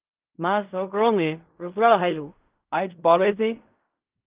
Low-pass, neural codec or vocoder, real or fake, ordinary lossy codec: 3.6 kHz; codec, 16 kHz in and 24 kHz out, 0.4 kbps, LongCat-Audio-Codec, fine tuned four codebook decoder; fake; Opus, 32 kbps